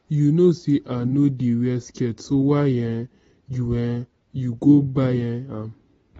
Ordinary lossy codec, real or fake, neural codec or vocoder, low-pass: AAC, 24 kbps; real; none; 19.8 kHz